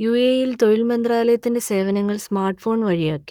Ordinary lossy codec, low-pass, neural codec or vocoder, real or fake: none; 19.8 kHz; codec, 44.1 kHz, 7.8 kbps, Pupu-Codec; fake